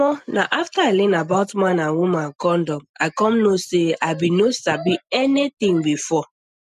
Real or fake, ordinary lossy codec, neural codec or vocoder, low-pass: real; none; none; 14.4 kHz